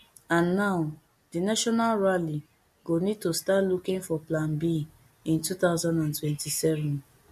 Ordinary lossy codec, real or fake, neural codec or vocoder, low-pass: MP3, 64 kbps; real; none; 14.4 kHz